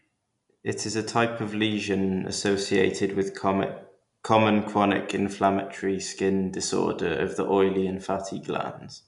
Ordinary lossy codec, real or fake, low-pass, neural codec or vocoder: none; real; 10.8 kHz; none